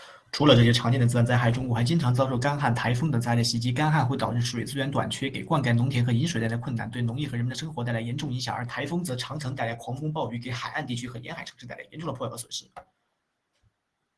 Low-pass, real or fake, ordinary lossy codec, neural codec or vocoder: 10.8 kHz; real; Opus, 16 kbps; none